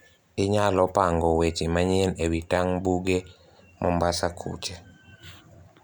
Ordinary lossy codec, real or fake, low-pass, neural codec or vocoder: none; real; none; none